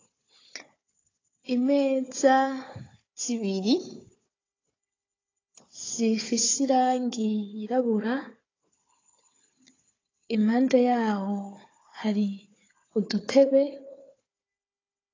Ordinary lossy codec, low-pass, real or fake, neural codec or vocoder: AAC, 32 kbps; 7.2 kHz; fake; codec, 16 kHz, 4 kbps, FunCodec, trained on Chinese and English, 50 frames a second